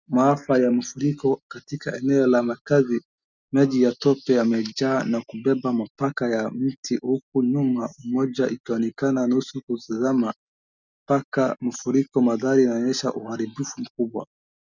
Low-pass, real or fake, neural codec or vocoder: 7.2 kHz; real; none